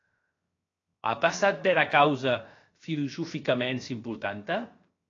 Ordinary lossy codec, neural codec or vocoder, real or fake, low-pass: AAC, 32 kbps; codec, 16 kHz, 0.7 kbps, FocalCodec; fake; 7.2 kHz